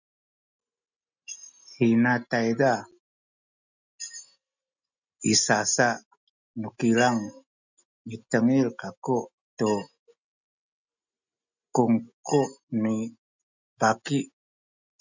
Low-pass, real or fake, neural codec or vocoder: 7.2 kHz; real; none